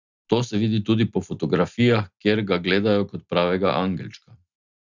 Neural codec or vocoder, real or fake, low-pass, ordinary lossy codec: none; real; 7.2 kHz; none